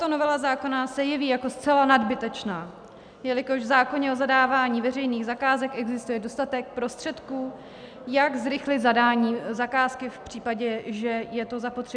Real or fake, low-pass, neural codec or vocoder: real; 9.9 kHz; none